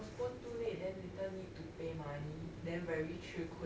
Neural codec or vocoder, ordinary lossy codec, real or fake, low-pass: none; none; real; none